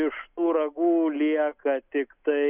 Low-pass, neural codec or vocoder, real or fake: 3.6 kHz; none; real